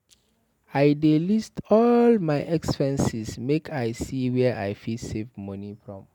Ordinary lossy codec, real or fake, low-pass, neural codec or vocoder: none; real; 19.8 kHz; none